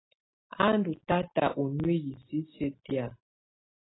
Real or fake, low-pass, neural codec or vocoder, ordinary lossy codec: real; 7.2 kHz; none; AAC, 16 kbps